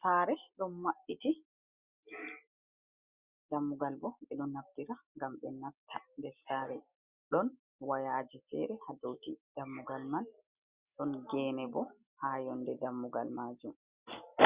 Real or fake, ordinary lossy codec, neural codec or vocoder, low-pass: real; Opus, 64 kbps; none; 3.6 kHz